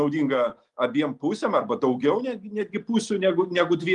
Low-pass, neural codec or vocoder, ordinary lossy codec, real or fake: 10.8 kHz; none; Opus, 32 kbps; real